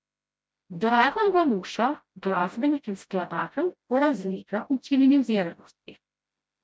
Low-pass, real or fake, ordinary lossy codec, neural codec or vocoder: none; fake; none; codec, 16 kHz, 0.5 kbps, FreqCodec, smaller model